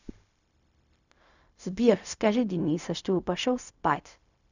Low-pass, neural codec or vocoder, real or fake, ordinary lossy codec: 7.2 kHz; codec, 16 kHz, 0.4 kbps, LongCat-Audio-Codec; fake; none